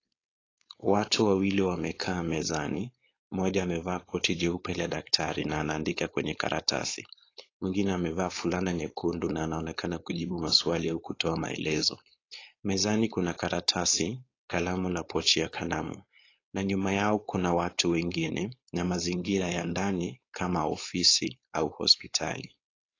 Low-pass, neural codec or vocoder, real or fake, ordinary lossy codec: 7.2 kHz; codec, 16 kHz, 4.8 kbps, FACodec; fake; AAC, 32 kbps